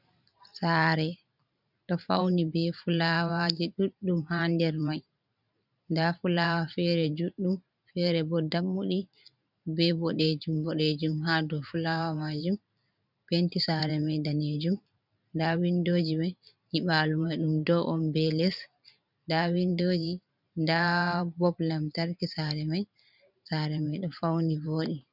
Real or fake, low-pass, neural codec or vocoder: fake; 5.4 kHz; vocoder, 44.1 kHz, 128 mel bands every 512 samples, BigVGAN v2